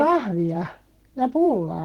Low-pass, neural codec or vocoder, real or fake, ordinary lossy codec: 19.8 kHz; vocoder, 44.1 kHz, 128 mel bands every 512 samples, BigVGAN v2; fake; Opus, 16 kbps